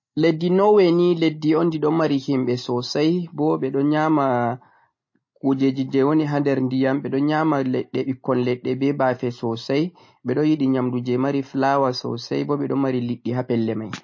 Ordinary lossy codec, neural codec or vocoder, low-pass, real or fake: MP3, 32 kbps; none; 7.2 kHz; real